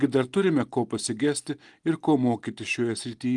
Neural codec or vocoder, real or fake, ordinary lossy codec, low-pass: none; real; Opus, 24 kbps; 10.8 kHz